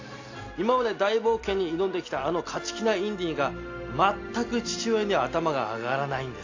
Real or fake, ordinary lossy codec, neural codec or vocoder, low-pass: real; AAC, 48 kbps; none; 7.2 kHz